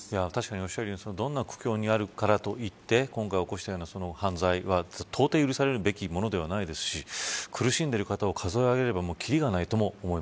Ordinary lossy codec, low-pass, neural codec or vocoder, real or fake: none; none; none; real